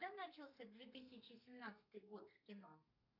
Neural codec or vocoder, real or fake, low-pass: codec, 32 kHz, 1.9 kbps, SNAC; fake; 5.4 kHz